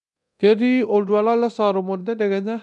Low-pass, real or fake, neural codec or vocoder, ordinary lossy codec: 10.8 kHz; fake; codec, 24 kHz, 0.9 kbps, DualCodec; none